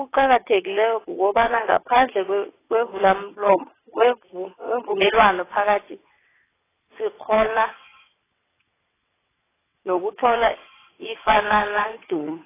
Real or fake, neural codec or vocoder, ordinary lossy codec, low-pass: fake; vocoder, 22.05 kHz, 80 mel bands, WaveNeXt; AAC, 16 kbps; 3.6 kHz